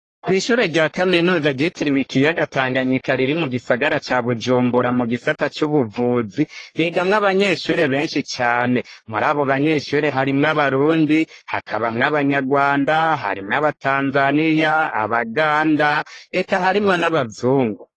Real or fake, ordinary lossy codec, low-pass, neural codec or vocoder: fake; AAC, 32 kbps; 10.8 kHz; codec, 44.1 kHz, 1.7 kbps, Pupu-Codec